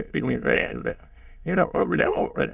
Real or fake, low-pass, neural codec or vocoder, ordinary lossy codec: fake; 3.6 kHz; autoencoder, 22.05 kHz, a latent of 192 numbers a frame, VITS, trained on many speakers; Opus, 64 kbps